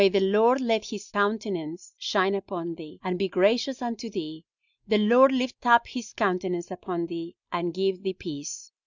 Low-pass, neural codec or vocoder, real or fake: 7.2 kHz; none; real